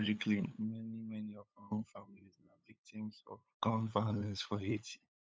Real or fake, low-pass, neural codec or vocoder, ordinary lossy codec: fake; none; codec, 16 kHz, 8 kbps, FunCodec, trained on LibriTTS, 25 frames a second; none